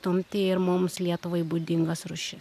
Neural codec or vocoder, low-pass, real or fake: none; 14.4 kHz; real